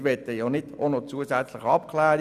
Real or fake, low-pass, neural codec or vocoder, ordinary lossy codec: real; 14.4 kHz; none; none